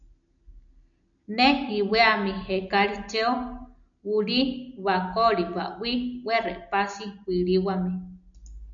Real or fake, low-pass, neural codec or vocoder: real; 7.2 kHz; none